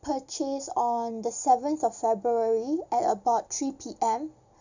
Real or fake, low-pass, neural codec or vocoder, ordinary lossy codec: real; 7.2 kHz; none; none